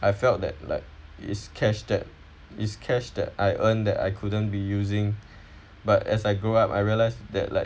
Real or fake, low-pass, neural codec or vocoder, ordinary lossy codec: real; none; none; none